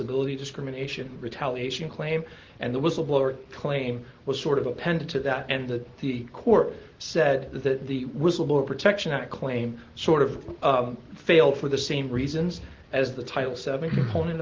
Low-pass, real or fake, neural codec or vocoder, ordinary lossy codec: 7.2 kHz; real; none; Opus, 16 kbps